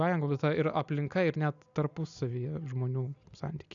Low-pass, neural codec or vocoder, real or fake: 7.2 kHz; none; real